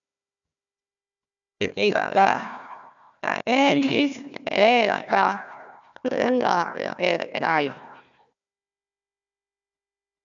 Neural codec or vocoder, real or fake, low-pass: codec, 16 kHz, 1 kbps, FunCodec, trained on Chinese and English, 50 frames a second; fake; 7.2 kHz